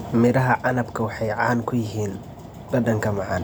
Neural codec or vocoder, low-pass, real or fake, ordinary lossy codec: none; none; real; none